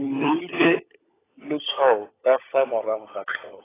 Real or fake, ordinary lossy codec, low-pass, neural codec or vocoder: fake; AAC, 16 kbps; 3.6 kHz; codec, 16 kHz, 16 kbps, FunCodec, trained on LibriTTS, 50 frames a second